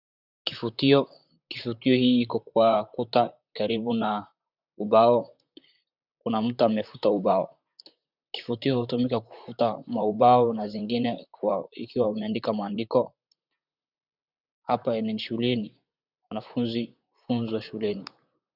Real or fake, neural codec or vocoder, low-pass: fake; vocoder, 44.1 kHz, 128 mel bands, Pupu-Vocoder; 5.4 kHz